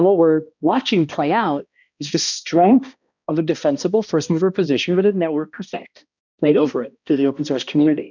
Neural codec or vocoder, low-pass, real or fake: codec, 16 kHz, 1 kbps, X-Codec, HuBERT features, trained on balanced general audio; 7.2 kHz; fake